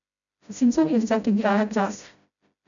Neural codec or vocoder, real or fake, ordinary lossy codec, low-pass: codec, 16 kHz, 0.5 kbps, FreqCodec, smaller model; fake; none; 7.2 kHz